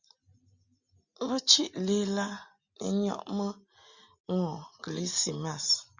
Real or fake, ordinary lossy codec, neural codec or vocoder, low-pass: real; Opus, 64 kbps; none; 7.2 kHz